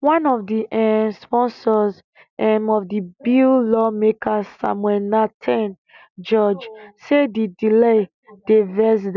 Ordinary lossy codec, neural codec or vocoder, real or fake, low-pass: none; none; real; 7.2 kHz